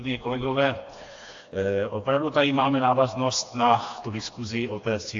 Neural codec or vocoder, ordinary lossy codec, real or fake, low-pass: codec, 16 kHz, 2 kbps, FreqCodec, smaller model; AAC, 48 kbps; fake; 7.2 kHz